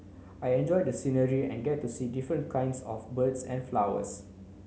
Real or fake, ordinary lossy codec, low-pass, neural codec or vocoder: real; none; none; none